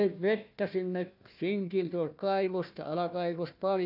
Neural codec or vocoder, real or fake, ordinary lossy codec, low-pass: codec, 16 kHz, 1 kbps, FunCodec, trained on Chinese and English, 50 frames a second; fake; none; 5.4 kHz